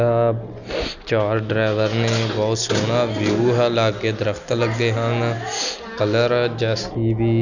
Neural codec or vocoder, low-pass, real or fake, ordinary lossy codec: none; 7.2 kHz; real; none